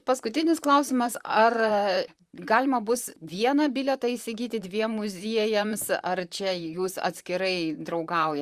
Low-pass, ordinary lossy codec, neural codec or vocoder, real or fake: 14.4 kHz; Opus, 64 kbps; vocoder, 44.1 kHz, 128 mel bands, Pupu-Vocoder; fake